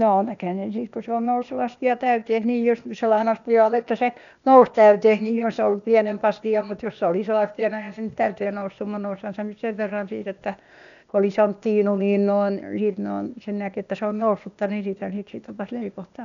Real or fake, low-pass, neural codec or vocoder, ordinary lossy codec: fake; 7.2 kHz; codec, 16 kHz, 0.8 kbps, ZipCodec; none